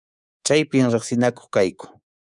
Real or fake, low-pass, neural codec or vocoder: fake; 10.8 kHz; codec, 24 kHz, 3.1 kbps, DualCodec